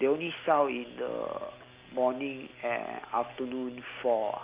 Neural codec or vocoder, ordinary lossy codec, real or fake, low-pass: none; Opus, 32 kbps; real; 3.6 kHz